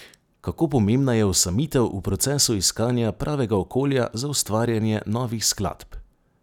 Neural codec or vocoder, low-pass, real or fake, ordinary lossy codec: none; 19.8 kHz; real; none